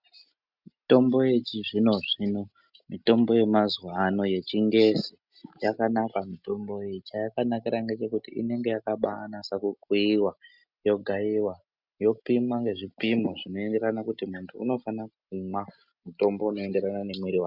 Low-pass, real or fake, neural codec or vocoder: 5.4 kHz; real; none